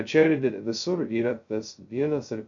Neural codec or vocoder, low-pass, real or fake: codec, 16 kHz, 0.2 kbps, FocalCodec; 7.2 kHz; fake